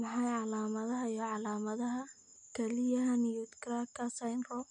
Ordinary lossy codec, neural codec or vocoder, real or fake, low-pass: MP3, 96 kbps; none; real; 10.8 kHz